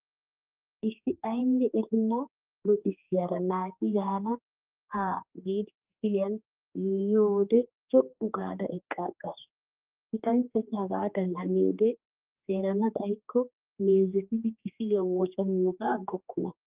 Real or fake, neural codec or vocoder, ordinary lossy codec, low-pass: fake; codec, 16 kHz, 2 kbps, X-Codec, HuBERT features, trained on general audio; Opus, 32 kbps; 3.6 kHz